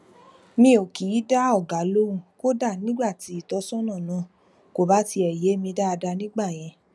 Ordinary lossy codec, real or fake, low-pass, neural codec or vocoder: none; real; none; none